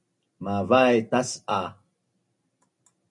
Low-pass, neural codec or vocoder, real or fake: 10.8 kHz; none; real